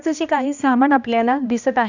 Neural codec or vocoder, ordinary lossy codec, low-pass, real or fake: codec, 16 kHz, 1 kbps, X-Codec, HuBERT features, trained on balanced general audio; none; 7.2 kHz; fake